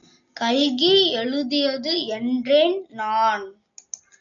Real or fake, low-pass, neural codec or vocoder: real; 7.2 kHz; none